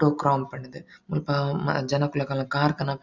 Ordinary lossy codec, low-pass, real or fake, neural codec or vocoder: none; 7.2 kHz; real; none